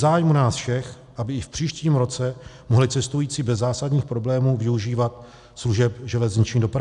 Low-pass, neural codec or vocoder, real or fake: 10.8 kHz; none; real